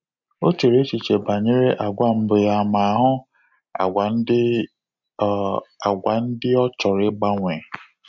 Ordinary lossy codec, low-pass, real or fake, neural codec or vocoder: none; 7.2 kHz; real; none